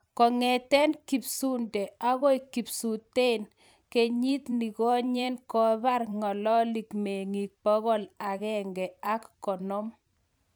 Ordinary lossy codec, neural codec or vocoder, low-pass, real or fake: none; vocoder, 44.1 kHz, 128 mel bands every 512 samples, BigVGAN v2; none; fake